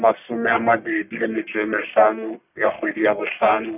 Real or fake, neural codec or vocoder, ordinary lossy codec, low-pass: fake; codec, 44.1 kHz, 1.7 kbps, Pupu-Codec; none; 3.6 kHz